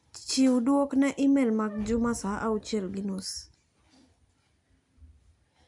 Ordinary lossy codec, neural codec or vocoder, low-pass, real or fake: MP3, 96 kbps; none; 10.8 kHz; real